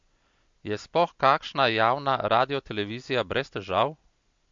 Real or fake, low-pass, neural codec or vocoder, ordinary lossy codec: real; 7.2 kHz; none; MP3, 48 kbps